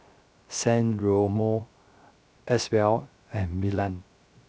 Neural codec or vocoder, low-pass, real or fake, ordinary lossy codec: codec, 16 kHz, 0.3 kbps, FocalCodec; none; fake; none